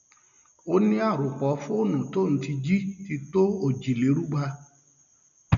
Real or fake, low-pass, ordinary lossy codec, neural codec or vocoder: real; 7.2 kHz; none; none